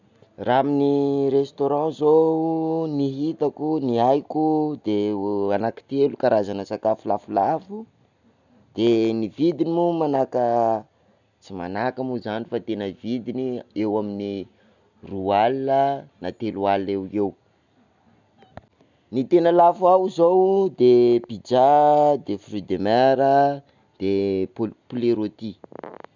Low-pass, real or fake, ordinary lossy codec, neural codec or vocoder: 7.2 kHz; real; none; none